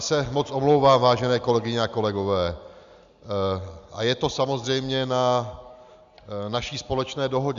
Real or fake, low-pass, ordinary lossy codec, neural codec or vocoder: real; 7.2 kHz; Opus, 64 kbps; none